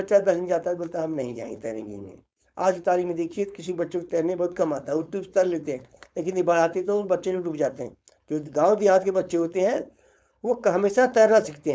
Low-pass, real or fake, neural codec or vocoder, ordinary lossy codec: none; fake; codec, 16 kHz, 4.8 kbps, FACodec; none